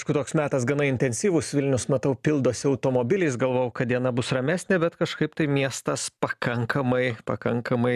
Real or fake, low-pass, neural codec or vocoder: real; 14.4 kHz; none